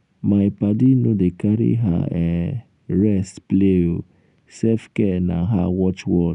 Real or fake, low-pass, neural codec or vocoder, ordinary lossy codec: real; 10.8 kHz; none; none